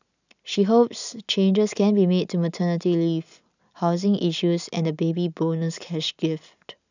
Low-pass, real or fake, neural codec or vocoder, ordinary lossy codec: 7.2 kHz; real; none; none